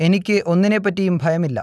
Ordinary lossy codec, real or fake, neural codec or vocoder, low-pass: none; real; none; none